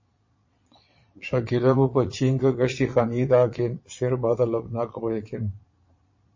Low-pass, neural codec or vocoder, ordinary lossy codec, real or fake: 7.2 kHz; vocoder, 22.05 kHz, 80 mel bands, Vocos; MP3, 32 kbps; fake